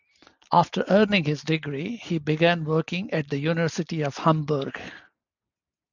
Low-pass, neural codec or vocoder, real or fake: 7.2 kHz; none; real